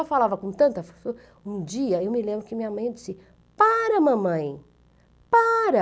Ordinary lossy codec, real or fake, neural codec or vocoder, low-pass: none; real; none; none